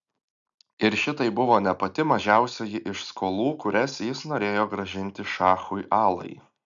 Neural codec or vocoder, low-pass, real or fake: none; 7.2 kHz; real